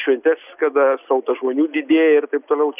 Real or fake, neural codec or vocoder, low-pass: real; none; 3.6 kHz